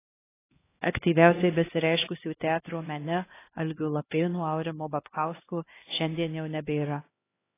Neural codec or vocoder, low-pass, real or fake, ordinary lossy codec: codec, 16 kHz, 1 kbps, X-Codec, HuBERT features, trained on LibriSpeech; 3.6 kHz; fake; AAC, 16 kbps